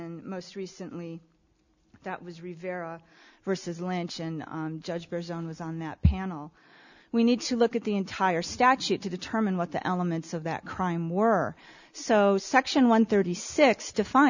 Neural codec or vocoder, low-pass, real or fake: none; 7.2 kHz; real